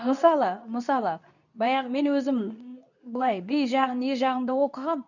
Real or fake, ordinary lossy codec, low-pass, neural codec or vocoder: fake; none; 7.2 kHz; codec, 24 kHz, 0.9 kbps, WavTokenizer, medium speech release version 2